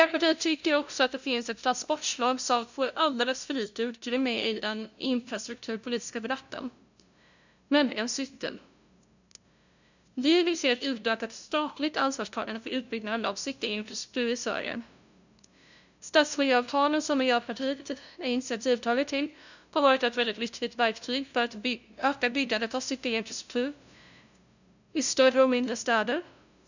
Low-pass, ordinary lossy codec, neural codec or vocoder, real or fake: 7.2 kHz; none; codec, 16 kHz, 0.5 kbps, FunCodec, trained on LibriTTS, 25 frames a second; fake